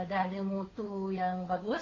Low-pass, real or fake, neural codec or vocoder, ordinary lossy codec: 7.2 kHz; fake; codec, 16 kHz, 2 kbps, FunCodec, trained on Chinese and English, 25 frames a second; AAC, 32 kbps